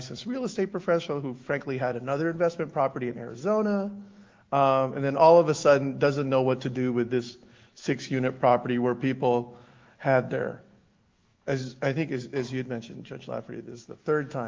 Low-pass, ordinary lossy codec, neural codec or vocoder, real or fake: 7.2 kHz; Opus, 32 kbps; autoencoder, 48 kHz, 128 numbers a frame, DAC-VAE, trained on Japanese speech; fake